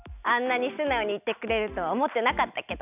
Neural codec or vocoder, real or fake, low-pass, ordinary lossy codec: none; real; 3.6 kHz; none